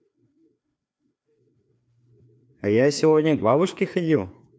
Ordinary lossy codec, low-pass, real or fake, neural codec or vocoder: none; none; fake; codec, 16 kHz, 2 kbps, FreqCodec, larger model